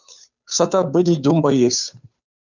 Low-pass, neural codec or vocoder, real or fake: 7.2 kHz; codec, 16 kHz, 2 kbps, FunCodec, trained on Chinese and English, 25 frames a second; fake